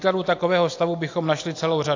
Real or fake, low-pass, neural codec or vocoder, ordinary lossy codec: real; 7.2 kHz; none; AAC, 48 kbps